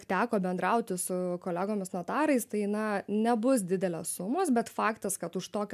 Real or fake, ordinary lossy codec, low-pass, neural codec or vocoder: real; MP3, 96 kbps; 14.4 kHz; none